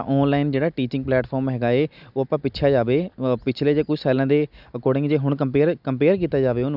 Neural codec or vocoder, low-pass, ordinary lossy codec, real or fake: none; 5.4 kHz; none; real